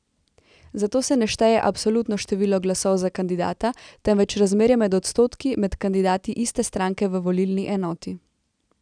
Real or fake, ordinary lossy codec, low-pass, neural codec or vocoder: real; none; 9.9 kHz; none